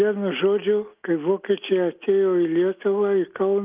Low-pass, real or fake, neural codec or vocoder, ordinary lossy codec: 3.6 kHz; real; none; Opus, 32 kbps